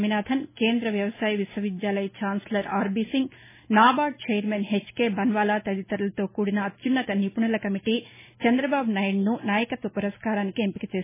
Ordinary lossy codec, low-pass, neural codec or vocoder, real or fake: MP3, 16 kbps; 3.6 kHz; none; real